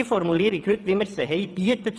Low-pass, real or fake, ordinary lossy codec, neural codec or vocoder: none; fake; none; vocoder, 22.05 kHz, 80 mel bands, WaveNeXt